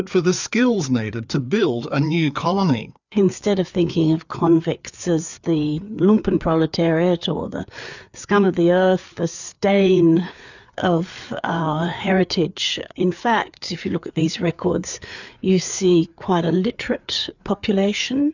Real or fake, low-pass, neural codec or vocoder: fake; 7.2 kHz; codec, 16 kHz, 4 kbps, FreqCodec, larger model